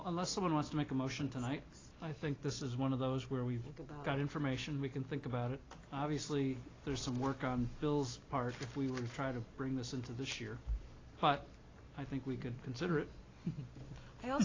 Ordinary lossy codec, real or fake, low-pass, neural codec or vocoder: AAC, 32 kbps; real; 7.2 kHz; none